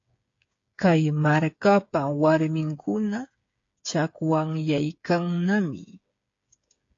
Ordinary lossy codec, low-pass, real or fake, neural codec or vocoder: AAC, 48 kbps; 7.2 kHz; fake; codec, 16 kHz, 8 kbps, FreqCodec, smaller model